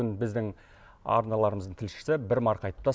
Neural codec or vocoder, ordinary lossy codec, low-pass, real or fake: none; none; none; real